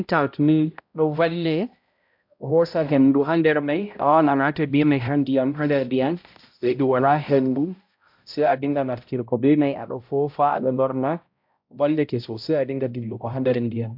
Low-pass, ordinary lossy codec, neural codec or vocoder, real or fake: 5.4 kHz; MP3, 48 kbps; codec, 16 kHz, 0.5 kbps, X-Codec, HuBERT features, trained on balanced general audio; fake